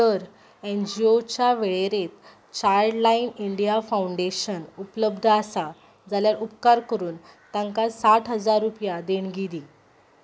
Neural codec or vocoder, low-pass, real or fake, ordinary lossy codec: none; none; real; none